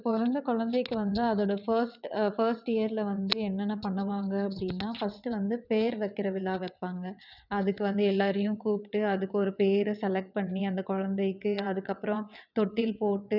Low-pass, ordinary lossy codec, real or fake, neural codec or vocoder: 5.4 kHz; none; fake; vocoder, 22.05 kHz, 80 mel bands, WaveNeXt